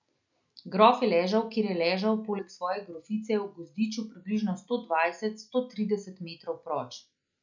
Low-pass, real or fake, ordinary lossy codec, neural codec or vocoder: 7.2 kHz; real; none; none